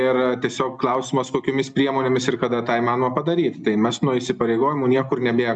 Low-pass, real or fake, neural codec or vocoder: 9.9 kHz; real; none